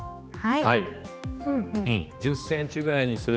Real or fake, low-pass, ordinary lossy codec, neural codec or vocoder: fake; none; none; codec, 16 kHz, 2 kbps, X-Codec, HuBERT features, trained on general audio